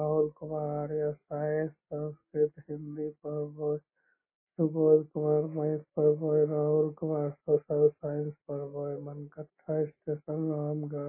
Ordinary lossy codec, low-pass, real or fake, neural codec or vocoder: MP3, 16 kbps; 3.6 kHz; real; none